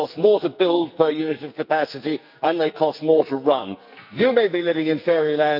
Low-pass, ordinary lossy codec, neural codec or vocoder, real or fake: 5.4 kHz; none; codec, 44.1 kHz, 2.6 kbps, SNAC; fake